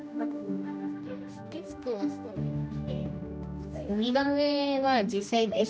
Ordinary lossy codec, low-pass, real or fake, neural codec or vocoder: none; none; fake; codec, 16 kHz, 1 kbps, X-Codec, HuBERT features, trained on general audio